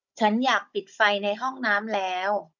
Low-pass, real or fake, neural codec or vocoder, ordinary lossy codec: 7.2 kHz; fake; codec, 16 kHz, 16 kbps, FunCodec, trained on Chinese and English, 50 frames a second; none